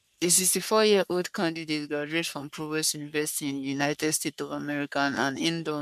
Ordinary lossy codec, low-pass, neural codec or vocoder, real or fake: MP3, 96 kbps; 14.4 kHz; codec, 44.1 kHz, 3.4 kbps, Pupu-Codec; fake